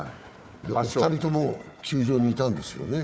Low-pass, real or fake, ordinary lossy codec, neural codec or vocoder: none; fake; none; codec, 16 kHz, 4 kbps, FunCodec, trained on Chinese and English, 50 frames a second